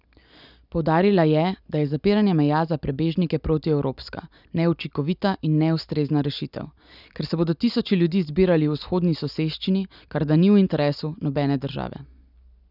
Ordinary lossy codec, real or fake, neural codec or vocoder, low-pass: none; real; none; 5.4 kHz